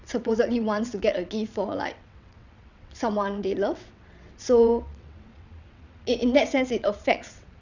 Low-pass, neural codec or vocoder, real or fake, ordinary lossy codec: 7.2 kHz; vocoder, 22.05 kHz, 80 mel bands, WaveNeXt; fake; none